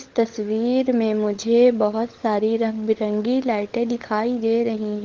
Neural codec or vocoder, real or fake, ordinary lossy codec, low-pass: codec, 16 kHz, 16 kbps, FunCodec, trained on LibriTTS, 50 frames a second; fake; Opus, 16 kbps; 7.2 kHz